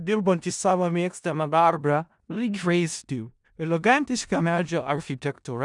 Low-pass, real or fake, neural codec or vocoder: 10.8 kHz; fake; codec, 16 kHz in and 24 kHz out, 0.4 kbps, LongCat-Audio-Codec, four codebook decoder